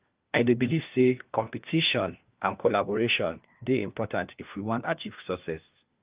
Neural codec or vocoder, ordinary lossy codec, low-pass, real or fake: codec, 16 kHz, 1 kbps, FunCodec, trained on LibriTTS, 50 frames a second; Opus, 32 kbps; 3.6 kHz; fake